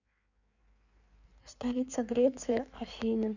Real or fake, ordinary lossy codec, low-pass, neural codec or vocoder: fake; none; 7.2 kHz; codec, 16 kHz in and 24 kHz out, 1.1 kbps, FireRedTTS-2 codec